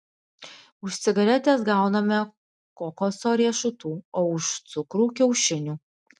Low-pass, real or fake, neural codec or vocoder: 10.8 kHz; real; none